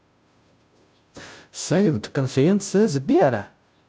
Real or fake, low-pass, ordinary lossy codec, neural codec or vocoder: fake; none; none; codec, 16 kHz, 0.5 kbps, FunCodec, trained on Chinese and English, 25 frames a second